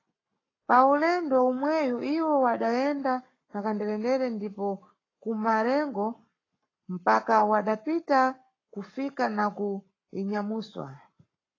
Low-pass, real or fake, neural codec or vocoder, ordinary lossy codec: 7.2 kHz; fake; codec, 44.1 kHz, 7.8 kbps, Pupu-Codec; AAC, 32 kbps